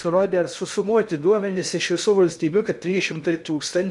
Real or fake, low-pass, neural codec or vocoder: fake; 10.8 kHz; codec, 16 kHz in and 24 kHz out, 0.6 kbps, FocalCodec, streaming, 2048 codes